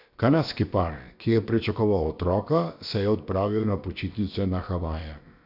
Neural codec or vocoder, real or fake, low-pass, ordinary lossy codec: codec, 16 kHz, about 1 kbps, DyCAST, with the encoder's durations; fake; 5.4 kHz; none